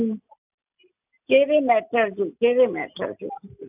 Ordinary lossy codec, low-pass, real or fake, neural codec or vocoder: AAC, 32 kbps; 3.6 kHz; real; none